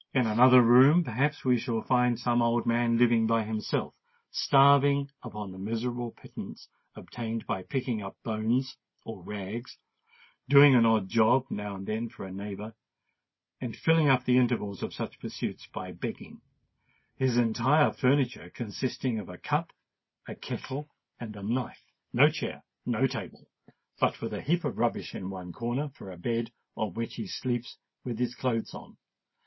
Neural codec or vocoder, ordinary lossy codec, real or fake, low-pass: none; MP3, 24 kbps; real; 7.2 kHz